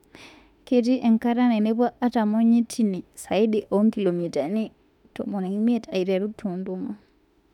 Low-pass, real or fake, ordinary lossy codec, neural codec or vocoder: 19.8 kHz; fake; none; autoencoder, 48 kHz, 32 numbers a frame, DAC-VAE, trained on Japanese speech